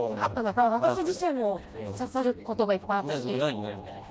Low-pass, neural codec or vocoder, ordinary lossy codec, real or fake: none; codec, 16 kHz, 1 kbps, FreqCodec, smaller model; none; fake